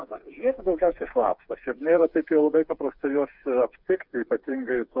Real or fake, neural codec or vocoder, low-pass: fake; codec, 16 kHz, 4 kbps, FreqCodec, smaller model; 5.4 kHz